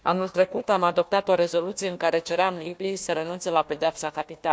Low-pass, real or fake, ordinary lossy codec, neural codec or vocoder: none; fake; none; codec, 16 kHz, 1 kbps, FunCodec, trained on Chinese and English, 50 frames a second